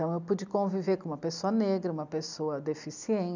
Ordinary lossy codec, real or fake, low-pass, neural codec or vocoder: none; real; 7.2 kHz; none